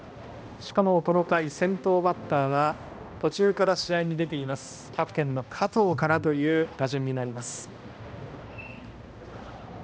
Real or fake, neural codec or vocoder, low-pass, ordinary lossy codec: fake; codec, 16 kHz, 1 kbps, X-Codec, HuBERT features, trained on balanced general audio; none; none